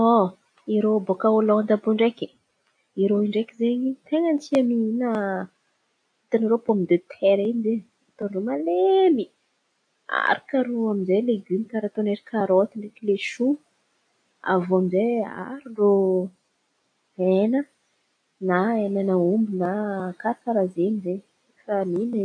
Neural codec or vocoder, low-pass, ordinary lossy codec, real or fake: none; 9.9 kHz; none; real